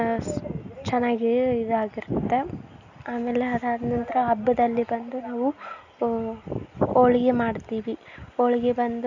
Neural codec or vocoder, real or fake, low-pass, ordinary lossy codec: none; real; 7.2 kHz; none